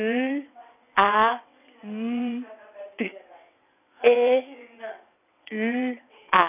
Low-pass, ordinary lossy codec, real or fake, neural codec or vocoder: 3.6 kHz; none; fake; vocoder, 22.05 kHz, 80 mel bands, WaveNeXt